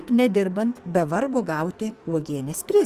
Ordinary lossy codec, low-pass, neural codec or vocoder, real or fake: Opus, 32 kbps; 14.4 kHz; codec, 44.1 kHz, 2.6 kbps, SNAC; fake